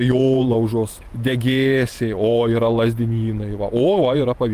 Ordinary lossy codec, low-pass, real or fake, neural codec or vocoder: Opus, 32 kbps; 14.4 kHz; fake; vocoder, 48 kHz, 128 mel bands, Vocos